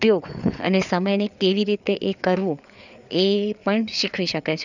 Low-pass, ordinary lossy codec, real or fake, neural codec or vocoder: 7.2 kHz; none; fake; codec, 16 kHz, 4 kbps, FreqCodec, larger model